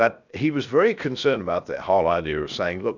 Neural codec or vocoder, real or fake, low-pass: codec, 16 kHz, 0.7 kbps, FocalCodec; fake; 7.2 kHz